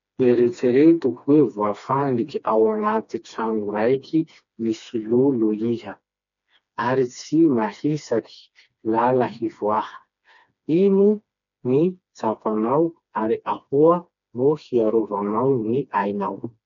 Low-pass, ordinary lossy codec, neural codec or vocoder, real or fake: 7.2 kHz; none; codec, 16 kHz, 2 kbps, FreqCodec, smaller model; fake